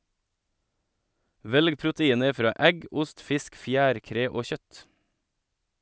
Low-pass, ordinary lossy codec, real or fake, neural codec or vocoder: none; none; real; none